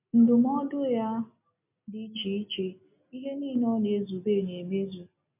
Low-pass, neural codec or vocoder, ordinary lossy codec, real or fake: 3.6 kHz; none; MP3, 32 kbps; real